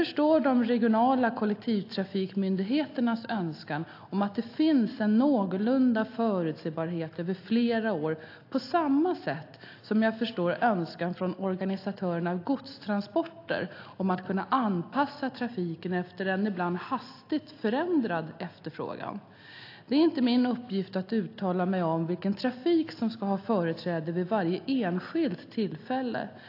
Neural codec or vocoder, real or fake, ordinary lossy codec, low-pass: none; real; AAC, 32 kbps; 5.4 kHz